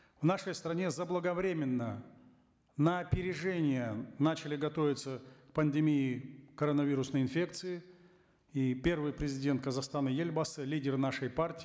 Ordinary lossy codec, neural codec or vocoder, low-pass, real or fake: none; none; none; real